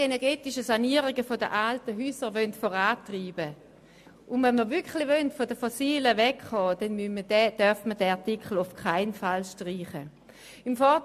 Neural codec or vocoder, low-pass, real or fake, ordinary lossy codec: none; 14.4 kHz; real; MP3, 64 kbps